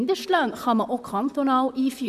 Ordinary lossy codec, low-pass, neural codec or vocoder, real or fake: none; 14.4 kHz; vocoder, 44.1 kHz, 128 mel bands, Pupu-Vocoder; fake